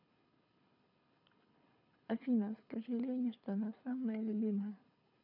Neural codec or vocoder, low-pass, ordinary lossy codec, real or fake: codec, 24 kHz, 6 kbps, HILCodec; 5.4 kHz; none; fake